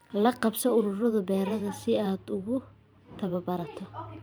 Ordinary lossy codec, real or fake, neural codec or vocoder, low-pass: none; fake; vocoder, 44.1 kHz, 128 mel bands every 512 samples, BigVGAN v2; none